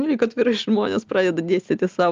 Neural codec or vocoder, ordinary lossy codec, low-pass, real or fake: none; Opus, 32 kbps; 7.2 kHz; real